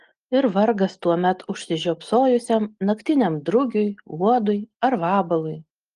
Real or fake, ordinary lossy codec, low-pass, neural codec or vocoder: real; Opus, 24 kbps; 10.8 kHz; none